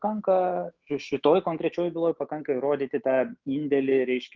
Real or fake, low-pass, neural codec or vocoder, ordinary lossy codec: fake; 7.2 kHz; autoencoder, 48 kHz, 128 numbers a frame, DAC-VAE, trained on Japanese speech; Opus, 32 kbps